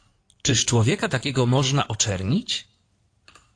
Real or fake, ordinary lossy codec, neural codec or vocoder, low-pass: fake; AAC, 48 kbps; codec, 16 kHz in and 24 kHz out, 2.2 kbps, FireRedTTS-2 codec; 9.9 kHz